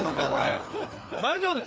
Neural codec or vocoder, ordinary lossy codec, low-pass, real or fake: codec, 16 kHz, 4 kbps, FreqCodec, larger model; none; none; fake